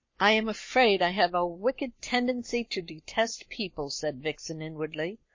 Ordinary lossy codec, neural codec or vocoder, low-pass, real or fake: MP3, 32 kbps; codec, 44.1 kHz, 7.8 kbps, Pupu-Codec; 7.2 kHz; fake